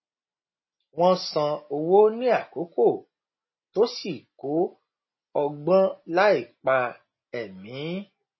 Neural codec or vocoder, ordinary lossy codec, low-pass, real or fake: none; MP3, 24 kbps; 7.2 kHz; real